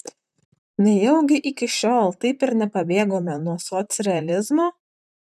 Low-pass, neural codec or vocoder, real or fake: 14.4 kHz; vocoder, 44.1 kHz, 128 mel bands, Pupu-Vocoder; fake